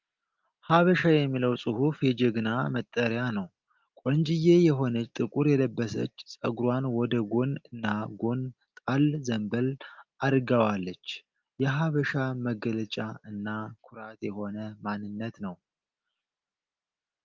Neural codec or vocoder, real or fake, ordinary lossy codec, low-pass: none; real; Opus, 24 kbps; 7.2 kHz